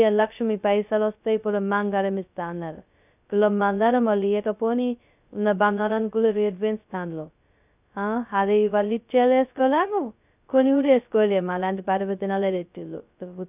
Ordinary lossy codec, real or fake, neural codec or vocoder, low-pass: none; fake; codec, 16 kHz, 0.2 kbps, FocalCodec; 3.6 kHz